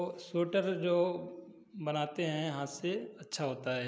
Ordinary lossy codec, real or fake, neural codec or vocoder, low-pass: none; real; none; none